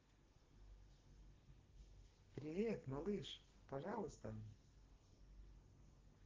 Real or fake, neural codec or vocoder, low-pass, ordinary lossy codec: fake; codec, 32 kHz, 1.9 kbps, SNAC; 7.2 kHz; Opus, 16 kbps